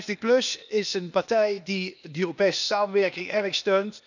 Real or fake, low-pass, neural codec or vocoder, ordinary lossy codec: fake; 7.2 kHz; codec, 16 kHz, 0.8 kbps, ZipCodec; none